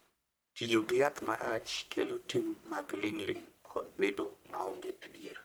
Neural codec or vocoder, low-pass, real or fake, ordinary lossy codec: codec, 44.1 kHz, 1.7 kbps, Pupu-Codec; none; fake; none